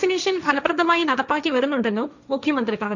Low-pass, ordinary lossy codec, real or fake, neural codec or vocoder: 7.2 kHz; none; fake; codec, 16 kHz, 1.1 kbps, Voila-Tokenizer